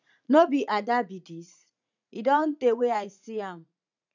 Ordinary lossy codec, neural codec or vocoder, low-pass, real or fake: none; vocoder, 44.1 kHz, 80 mel bands, Vocos; 7.2 kHz; fake